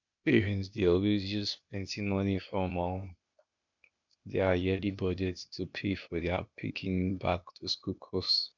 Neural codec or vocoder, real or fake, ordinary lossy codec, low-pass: codec, 16 kHz, 0.8 kbps, ZipCodec; fake; none; 7.2 kHz